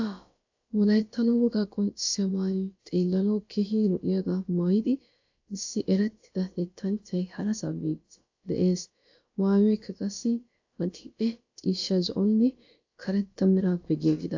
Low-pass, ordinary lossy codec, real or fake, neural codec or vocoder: 7.2 kHz; AAC, 48 kbps; fake; codec, 16 kHz, about 1 kbps, DyCAST, with the encoder's durations